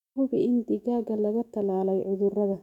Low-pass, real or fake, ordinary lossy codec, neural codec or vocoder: 19.8 kHz; fake; none; autoencoder, 48 kHz, 128 numbers a frame, DAC-VAE, trained on Japanese speech